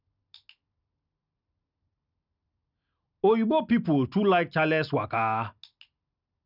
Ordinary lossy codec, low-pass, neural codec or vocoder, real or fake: none; 5.4 kHz; none; real